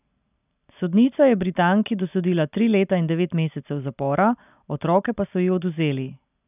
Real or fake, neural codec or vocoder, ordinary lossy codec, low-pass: real; none; none; 3.6 kHz